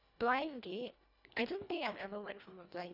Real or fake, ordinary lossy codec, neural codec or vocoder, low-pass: fake; none; codec, 24 kHz, 1.5 kbps, HILCodec; 5.4 kHz